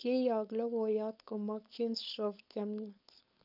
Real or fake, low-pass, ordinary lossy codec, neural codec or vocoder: fake; 5.4 kHz; none; codec, 16 kHz, 4.8 kbps, FACodec